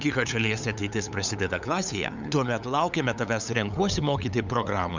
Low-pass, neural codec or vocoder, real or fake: 7.2 kHz; codec, 16 kHz, 8 kbps, FunCodec, trained on LibriTTS, 25 frames a second; fake